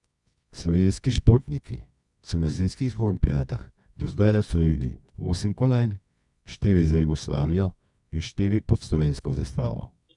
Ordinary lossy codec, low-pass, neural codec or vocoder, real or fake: none; 10.8 kHz; codec, 24 kHz, 0.9 kbps, WavTokenizer, medium music audio release; fake